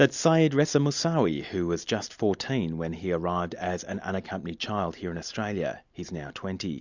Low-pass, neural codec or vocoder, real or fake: 7.2 kHz; none; real